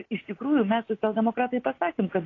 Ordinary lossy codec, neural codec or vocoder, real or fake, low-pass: AAC, 32 kbps; none; real; 7.2 kHz